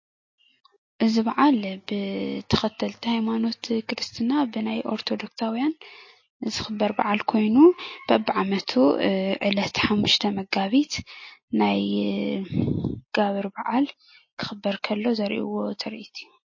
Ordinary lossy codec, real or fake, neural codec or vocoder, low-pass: MP3, 32 kbps; real; none; 7.2 kHz